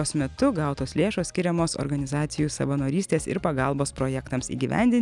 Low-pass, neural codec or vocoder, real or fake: 10.8 kHz; none; real